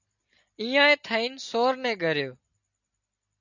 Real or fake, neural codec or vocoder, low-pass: real; none; 7.2 kHz